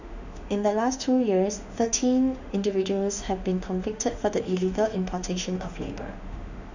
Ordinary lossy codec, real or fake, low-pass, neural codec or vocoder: none; fake; 7.2 kHz; autoencoder, 48 kHz, 32 numbers a frame, DAC-VAE, trained on Japanese speech